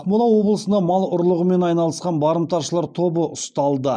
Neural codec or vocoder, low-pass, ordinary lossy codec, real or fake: none; 9.9 kHz; none; real